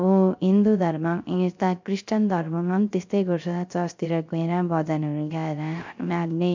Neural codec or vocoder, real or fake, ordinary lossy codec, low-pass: codec, 16 kHz, 0.3 kbps, FocalCodec; fake; MP3, 64 kbps; 7.2 kHz